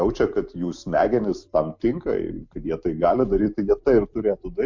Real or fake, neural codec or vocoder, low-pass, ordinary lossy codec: real; none; 7.2 kHz; MP3, 48 kbps